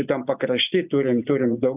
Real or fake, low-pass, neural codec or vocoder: real; 3.6 kHz; none